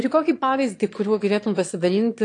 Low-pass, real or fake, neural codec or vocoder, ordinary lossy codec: 9.9 kHz; fake; autoencoder, 22.05 kHz, a latent of 192 numbers a frame, VITS, trained on one speaker; AAC, 48 kbps